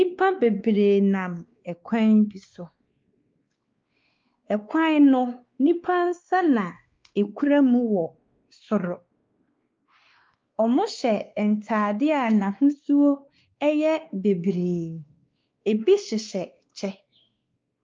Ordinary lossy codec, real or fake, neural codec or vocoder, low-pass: Opus, 24 kbps; fake; codec, 16 kHz, 2 kbps, X-Codec, WavLM features, trained on Multilingual LibriSpeech; 7.2 kHz